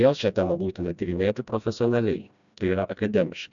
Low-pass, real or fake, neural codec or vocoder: 7.2 kHz; fake; codec, 16 kHz, 1 kbps, FreqCodec, smaller model